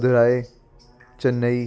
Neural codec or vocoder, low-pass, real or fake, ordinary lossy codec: none; none; real; none